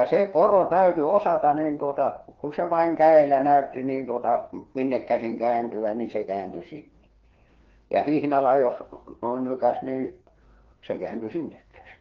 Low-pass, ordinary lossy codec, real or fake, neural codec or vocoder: 7.2 kHz; Opus, 16 kbps; fake; codec, 16 kHz, 2 kbps, FreqCodec, larger model